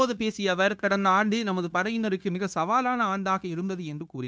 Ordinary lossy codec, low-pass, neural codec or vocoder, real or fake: none; none; codec, 16 kHz, 0.9 kbps, LongCat-Audio-Codec; fake